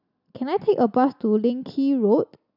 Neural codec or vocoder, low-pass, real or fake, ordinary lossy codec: none; 5.4 kHz; real; none